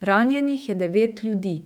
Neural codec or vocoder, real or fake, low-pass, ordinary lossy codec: autoencoder, 48 kHz, 32 numbers a frame, DAC-VAE, trained on Japanese speech; fake; 19.8 kHz; none